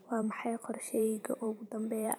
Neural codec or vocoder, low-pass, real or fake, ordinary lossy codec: vocoder, 44.1 kHz, 128 mel bands every 512 samples, BigVGAN v2; none; fake; none